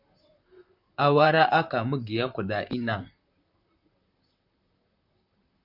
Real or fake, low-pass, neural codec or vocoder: fake; 5.4 kHz; vocoder, 44.1 kHz, 128 mel bands, Pupu-Vocoder